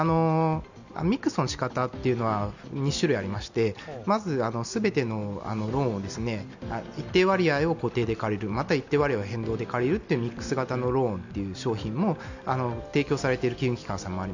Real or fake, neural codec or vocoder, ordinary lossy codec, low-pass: real; none; none; 7.2 kHz